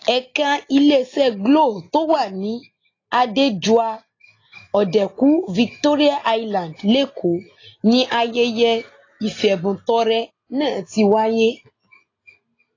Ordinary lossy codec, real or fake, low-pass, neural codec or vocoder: AAC, 32 kbps; real; 7.2 kHz; none